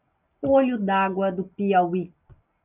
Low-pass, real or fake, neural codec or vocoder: 3.6 kHz; real; none